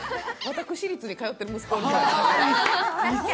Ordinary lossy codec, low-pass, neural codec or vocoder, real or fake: none; none; none; real